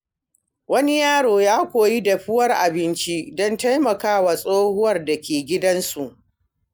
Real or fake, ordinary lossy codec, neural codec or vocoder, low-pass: real; none; none; none